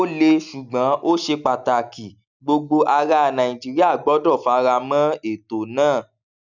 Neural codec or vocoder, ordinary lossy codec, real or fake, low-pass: none; none; real; 7.2 kHz